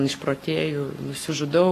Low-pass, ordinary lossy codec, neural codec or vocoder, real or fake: 14.4 kHz; AAC, 48 kbps; codec, 44.1 kHz, 7.8 kbps, Pupu-Codec; fake